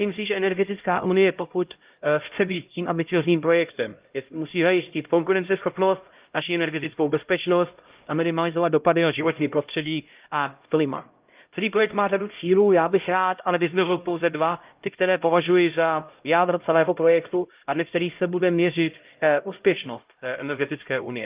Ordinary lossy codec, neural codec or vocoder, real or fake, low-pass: Opus, 24 kbps; codec, 16 kHz, 0.5 kbps, X-Codec, HuBERT features, trained on LibriSpeech; fake; 3.6 kHz